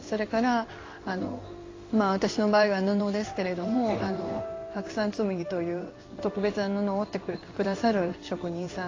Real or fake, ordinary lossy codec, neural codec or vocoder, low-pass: fake; AAC, 32 kbps; codec, 16 kHz in and 24 kHz out, 1 kbps, XY-Tokenizer; 7.2 kHz